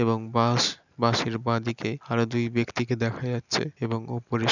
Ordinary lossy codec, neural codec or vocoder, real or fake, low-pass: none; none; real; 7.2 kHz